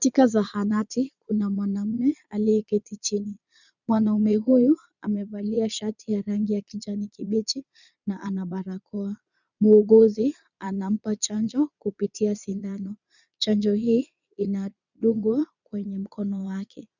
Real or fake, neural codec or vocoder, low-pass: fake; vocoder, 22.05 kHz, 80 mel bands, Vocos; 7.2 kHz